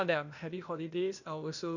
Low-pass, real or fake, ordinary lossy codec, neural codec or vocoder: 7.2 kHz; fake; none; codec, 16 kHz, 0.8 kbps, ZipCodec